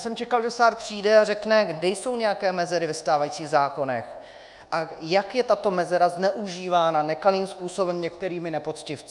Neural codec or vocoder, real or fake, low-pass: codec, 24 kHz, 1.2 kbps, DualCodec; fake; 10.8 kHz